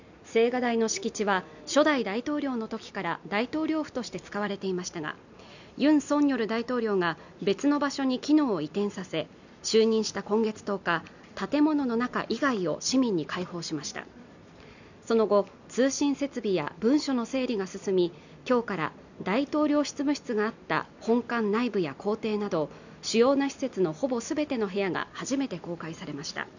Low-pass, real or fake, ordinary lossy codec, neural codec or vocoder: 7.2 kHz; real; none; none